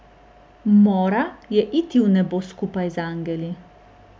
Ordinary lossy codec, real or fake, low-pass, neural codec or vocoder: none; real; none; none